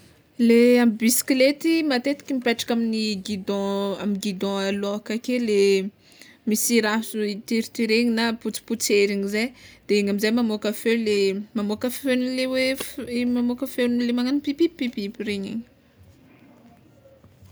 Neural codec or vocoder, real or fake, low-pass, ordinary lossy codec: none; real; none; none